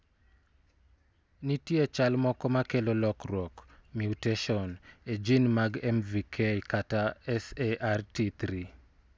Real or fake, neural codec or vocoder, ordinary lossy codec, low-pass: real; none; none; none